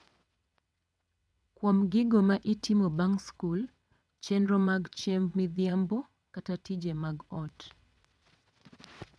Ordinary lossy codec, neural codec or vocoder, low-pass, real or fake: none; vocoder, 22.05 kHz, 80 mel bands, WaveNeXt; none; fake